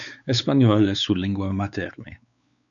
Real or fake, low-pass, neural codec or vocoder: fake; 7.2 kHz; codec, 16 kHz, 4 kbps, X-Codec, WavLM features, trained on Multilingual LibriSpeech